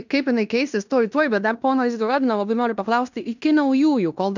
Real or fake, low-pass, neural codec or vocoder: fake; 7.2 kHz; codec, 16 kHz in and 24 kHz out, 0.9 kbps, LongCat-Audio-Codec, fine tuned four codebook decoder